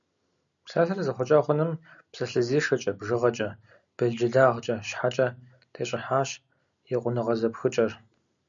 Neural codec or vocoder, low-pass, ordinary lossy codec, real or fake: none; 7.2 kHz; MP3, 96 kbps; real